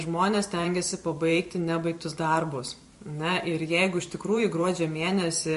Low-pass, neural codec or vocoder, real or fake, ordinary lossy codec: 14.4 kHz; vocoder, 44.1 kHz, 128 mel bands every 512 samples, BigVGAN v2; fake; MP3, 48 kbps